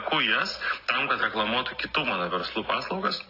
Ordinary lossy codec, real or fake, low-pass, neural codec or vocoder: AAC, 32 kbps; real; 5.4 kHz; none